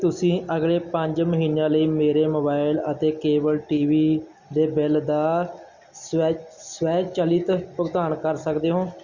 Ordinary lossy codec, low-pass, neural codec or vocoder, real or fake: Opus, 64 kbps; 7.2 kHz; none; real